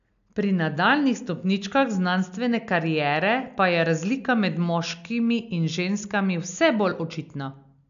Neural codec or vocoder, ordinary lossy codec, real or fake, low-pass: none; none; real; 7.2 kHz